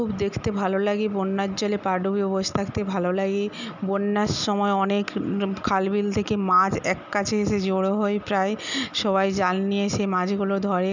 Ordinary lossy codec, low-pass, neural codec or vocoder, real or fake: none; 7.2 kHz; none; real